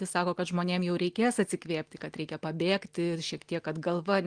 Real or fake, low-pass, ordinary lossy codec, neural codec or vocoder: real; 9.9 kHz; Opus, 24 kbps; none